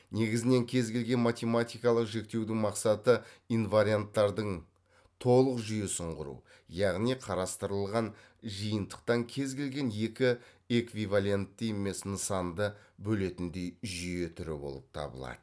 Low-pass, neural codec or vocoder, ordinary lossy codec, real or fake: none; none; none; real